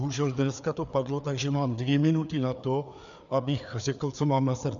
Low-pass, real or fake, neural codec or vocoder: 7.2 kHz; fake; codec, 16 kHz, 4 kbps, FreqCodec, larger model